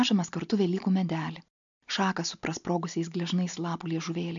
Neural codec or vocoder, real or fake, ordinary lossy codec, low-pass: none; real; MP3, 64 kbps; 7.2 kHz